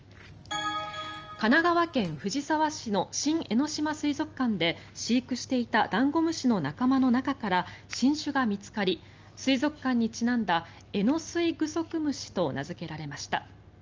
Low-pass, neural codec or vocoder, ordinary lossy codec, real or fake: 7.2 kHz; none; Opus, 24 kbps; real